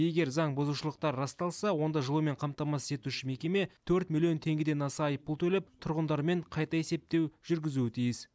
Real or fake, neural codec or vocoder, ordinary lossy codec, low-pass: real; none; none; none